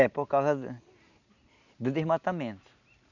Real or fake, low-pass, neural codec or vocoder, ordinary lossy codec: real; 7.2 kHz; none; none